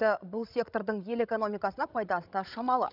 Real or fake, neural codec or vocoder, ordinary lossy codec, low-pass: fake; codec, 16 kHz, 16 kbps, FreqCodec, larger model; none; 5.4 kHz